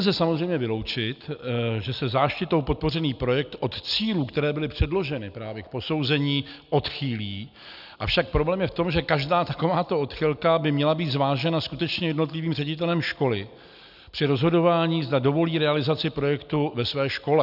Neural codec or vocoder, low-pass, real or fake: none; 5.4 kHz; real